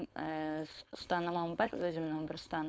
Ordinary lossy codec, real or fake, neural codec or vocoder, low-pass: none; fake; codec, 16 kHz, 4.8 kbps, FACodec; none